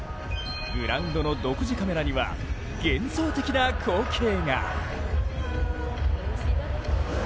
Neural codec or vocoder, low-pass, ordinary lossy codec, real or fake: none; none; none; real